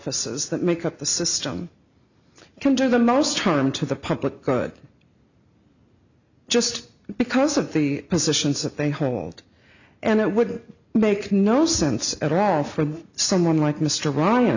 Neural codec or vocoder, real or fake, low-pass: none; real; 7.2 kHz